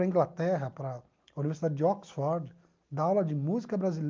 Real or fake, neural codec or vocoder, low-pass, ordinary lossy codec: real; none; 7.2 kHz; Opus, 24 kbps